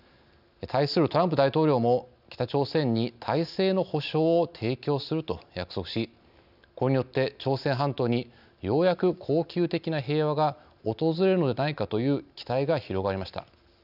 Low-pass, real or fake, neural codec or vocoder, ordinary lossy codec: 5.4 kHz; real; none; none